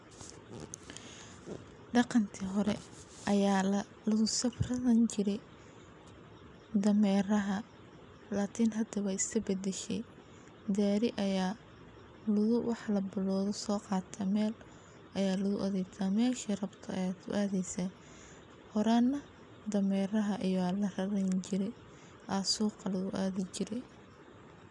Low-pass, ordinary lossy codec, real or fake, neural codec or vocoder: 10.8 kHz; none; real; none